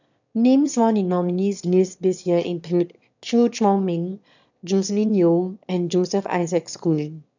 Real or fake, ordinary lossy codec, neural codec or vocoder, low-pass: fake; none; autoencoder, 22.05 kHz, a latent of 192 numbers a frame, VITS, trained on one speaker; 7.2 kHz